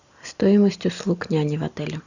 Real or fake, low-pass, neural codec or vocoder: real; 7.2 kHz; none